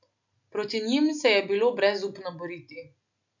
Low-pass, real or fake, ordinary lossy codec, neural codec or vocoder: 7.2 kHz; real; none; none